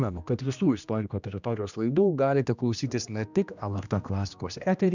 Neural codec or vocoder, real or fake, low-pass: codec, 16 kHz, 1 kbps, X-Codec, HuBERT features, trained on general audio; fake; 7.2 kHz